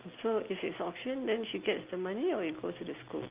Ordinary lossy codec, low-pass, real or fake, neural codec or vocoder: Opus, 32 kbps; 3.6 kHz; real; none